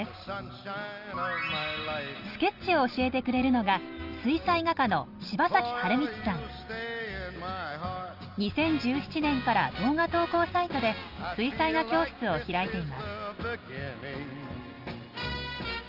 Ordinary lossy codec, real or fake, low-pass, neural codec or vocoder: Opus, 32 kbps; real; 5.4 kHz; none